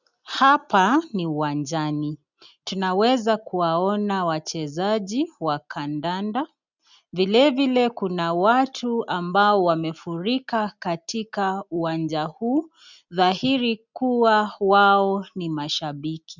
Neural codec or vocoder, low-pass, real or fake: none; 7.2 kHz; real